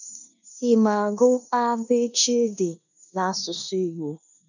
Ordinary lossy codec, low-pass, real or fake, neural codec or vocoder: none; 7.2 kHz; fake; codec, 16 kHz in and 24 kHz out, 0.9 kbps, LongCat-Audio-Codec, four codebook decoder